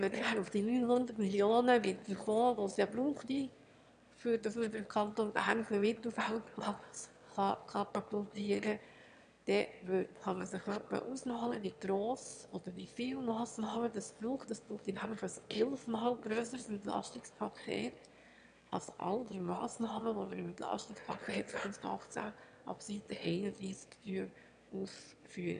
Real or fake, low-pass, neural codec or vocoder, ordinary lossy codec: fake; 9.9 kHz; autoencoder, 22.05 kHz, a latent of 192 numbers a frame, VITS, trained on one speaker; none